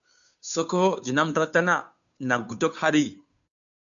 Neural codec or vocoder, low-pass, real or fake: codec, 16 kHz, 2 kbps, FunCodec, trained on Chinese and English, 25 frames a second; 7.2 kHz; fake